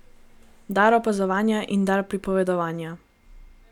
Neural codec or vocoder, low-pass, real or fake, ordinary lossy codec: none; 19.8 kHz; real; none